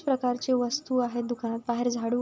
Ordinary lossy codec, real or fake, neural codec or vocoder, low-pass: none; real; none; none